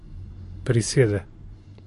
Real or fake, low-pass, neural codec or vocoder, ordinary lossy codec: fake; 14.4 kHz; vocoder, 44.1 kHz, 128 mel bands every 512 samples, BigVGAN v2; MP3, 48 kbps